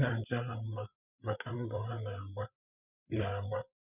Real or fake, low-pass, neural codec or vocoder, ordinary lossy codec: fake; 3.6 kHz; codec, 16 kHz, 8 kbps, FreqCodec, larger model; none